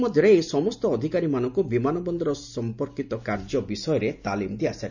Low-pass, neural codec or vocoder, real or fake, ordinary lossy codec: 7.2 kHz; none; real; none